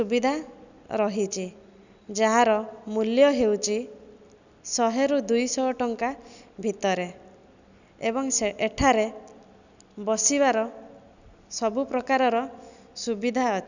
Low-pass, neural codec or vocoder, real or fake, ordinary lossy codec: 7.2 kHz; none; real; none